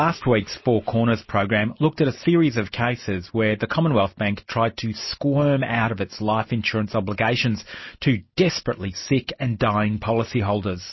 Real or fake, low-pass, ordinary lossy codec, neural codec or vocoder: real; 7.2 kHz; MP3, 24 kbps; none